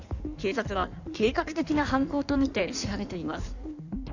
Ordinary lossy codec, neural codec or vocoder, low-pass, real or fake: none; codec, 16 kHz in and 24 kHz out, 1.1 kbps, FireRedTTS-2 codec; 7.2 kHz; fake